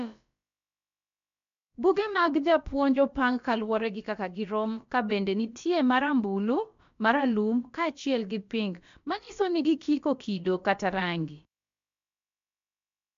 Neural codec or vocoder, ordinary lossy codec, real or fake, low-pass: codec, 16 kHz, about 1 kbps, DyCAST, with the encoder's durations; MP3, 64 kbps; fake; 7.2 kHz